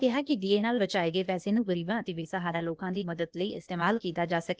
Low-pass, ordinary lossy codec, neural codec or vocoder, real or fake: none; none; codec, 16 kHz, 0.8 kbps, ZipCodec; fake